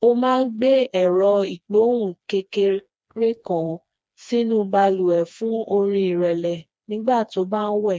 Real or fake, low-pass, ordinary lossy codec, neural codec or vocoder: fake; none; none; codec, 16 kHz, 2 kbps, FreqCodec, smaller model